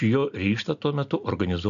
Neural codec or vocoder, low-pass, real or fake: none; 7.2 kHz; real